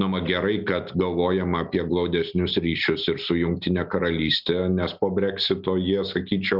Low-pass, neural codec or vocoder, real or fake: 5.4 kHz; none; real